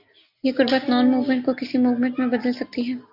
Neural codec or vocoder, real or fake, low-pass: none; real; 5.4 kHz